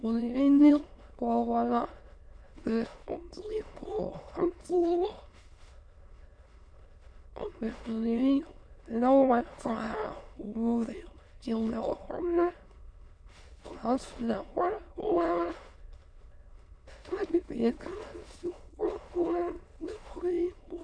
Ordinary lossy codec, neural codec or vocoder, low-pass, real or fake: MP3, 64 kbps; autoencoder, 22.05 kHz, a latent of 192 numbers a frame, VITS, trained on many speakers; 9.9 kHz; fake